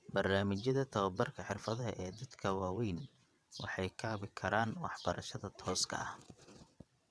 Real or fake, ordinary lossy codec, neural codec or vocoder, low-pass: fake; none; vocoder, 22.05 kHz, 80 mel bands, WaveNeXt; none